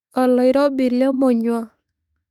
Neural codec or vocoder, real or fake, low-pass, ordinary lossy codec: autoencoder, 48 kHz, 32 numbers a frame, DAC-VAE, trained on Japanese speech; fake; 19.8 kHz; none